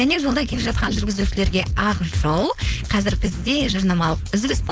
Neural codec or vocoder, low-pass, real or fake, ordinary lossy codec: codec, 16 kHz, 4.8 kbps, FACodec; none; fake; none